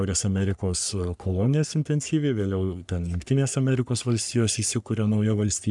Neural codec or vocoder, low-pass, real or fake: codec, 44.1 kHz, 3.4 kbps, Pupu-Codec; 10.8 kHz; fake